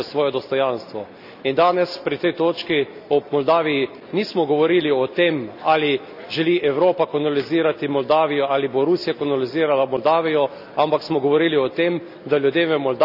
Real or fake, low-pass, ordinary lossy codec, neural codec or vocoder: real; 5.4 kHz; none; none